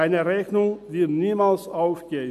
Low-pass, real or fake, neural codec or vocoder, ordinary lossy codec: 14.4 kHz; real; none; MP3, 96 kbps